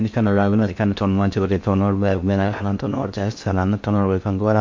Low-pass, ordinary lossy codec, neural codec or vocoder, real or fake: 7.2 kHz; MP3, 48 kbps; codec, 16 kHz in and 24 kHz out, 0.6 kbps, FocalCodec, streaming, 2048 codes; fake